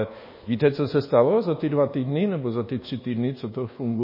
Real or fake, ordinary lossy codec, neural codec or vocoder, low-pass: fake; MP3, 24 kbps; codec, 24 kHz, 1.2 kbps, DualCodec; 5.4 kHz